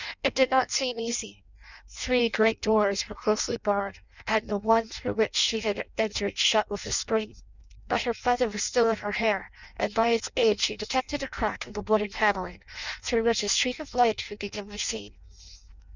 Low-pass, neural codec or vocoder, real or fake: 7.2 kHz; codec, 16 kHz in and 24 kHz out, 0.6 kbps, FireRedTTS-2 codec; fake